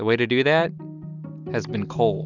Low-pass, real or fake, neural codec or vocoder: 7.2 kHz; real; none